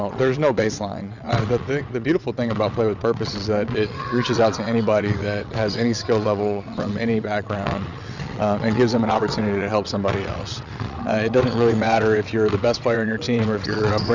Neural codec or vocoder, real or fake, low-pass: vocoder, 22.05 kHz, 80 mel bands, WaveNeXt; fake; 7.2 kHz